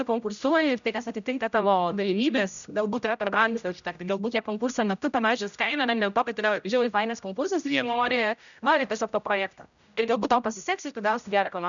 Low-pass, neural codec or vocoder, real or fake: 7.2 kHz; codec, 16 kHz, 0.5 kbps, X-Codec, HuBERT features, trained on general audio; fake